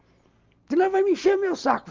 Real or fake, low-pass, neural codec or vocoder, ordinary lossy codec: real; 7.2 kHz; none; Opus, 32 kbps